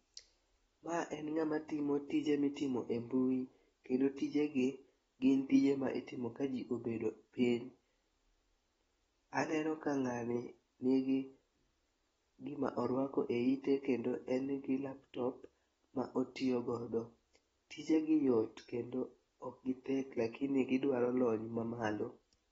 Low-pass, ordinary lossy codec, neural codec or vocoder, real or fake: 19.8 kHz; AAC, 24 kbps; none; real